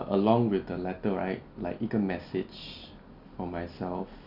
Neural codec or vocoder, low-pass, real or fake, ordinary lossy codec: none; 5.4 kHz; real; none